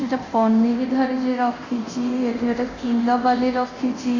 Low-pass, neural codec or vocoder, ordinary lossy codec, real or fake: 7.2 kHz; codec, 24 kHz, 0.9 kbps, DualCodec; Opus, 64 kbps; fake